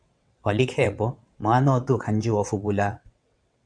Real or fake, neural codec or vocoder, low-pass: fake; vocoder, 22.05 kHz, 80 mel bands, WaveNeXt; 9.9 kHz